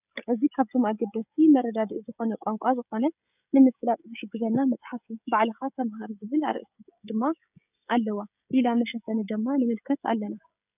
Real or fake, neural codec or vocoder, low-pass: fake; codec, 16 kHz, 16 kbps, FreqCodec, smaller model; 3.6 kHz